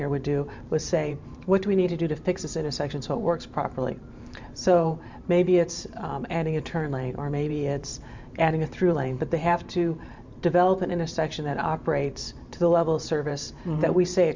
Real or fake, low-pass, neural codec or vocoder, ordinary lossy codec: fake; 7.2 kHz; vocoder, 44.1 kHz, 128 mel bands every 512 samples, BigVGAN v2; MP3, 64 kbps